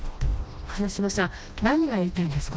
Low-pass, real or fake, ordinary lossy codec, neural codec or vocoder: none; fake; none; codec, 16 kHz, 1 kbps, FreqCodec, smaller model